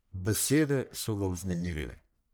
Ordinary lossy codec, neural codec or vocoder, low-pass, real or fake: none; codec, 44.1 kHz, 1.7 kbps, Pupu-Codec; none; fake